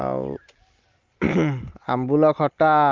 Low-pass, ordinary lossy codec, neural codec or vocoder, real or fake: 7.2 kHz; Opus, 24 kbps; none; real